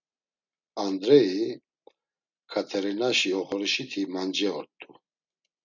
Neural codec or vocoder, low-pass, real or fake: none; 7.2 kHz; real